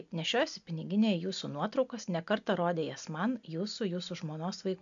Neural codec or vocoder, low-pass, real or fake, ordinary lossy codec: none; 7.2 kHz; real; MP3, 64 kbps